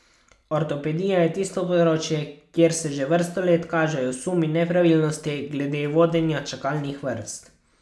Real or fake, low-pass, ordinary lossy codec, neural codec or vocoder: real; none; none; none